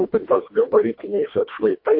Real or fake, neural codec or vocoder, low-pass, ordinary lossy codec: fake; codec, 24 kHz, 1.5 kbps, HILCodec; 5.4 kHz; MP3, 32 kbps